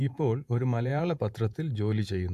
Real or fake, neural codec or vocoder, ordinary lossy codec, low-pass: fake; vocoder, 48 kHz, 128 mel bands, Vocos; AAC, 96 kbps; 14.4 kHz